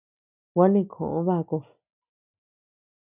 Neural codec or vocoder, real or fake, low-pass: none; real; 3.6 kHz